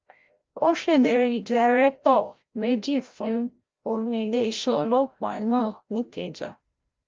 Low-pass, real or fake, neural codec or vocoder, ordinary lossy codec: 7.2 kHz; fake; codec, 16 kHz, 0.5 kbps, FreqCodec, larger model; Opus, 24 kbps